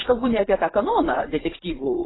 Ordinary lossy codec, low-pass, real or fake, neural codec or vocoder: AAC, 16 kbps; 7.2 kHz; fake; vocoder, 24 kHz, 100 mel bands, Vocos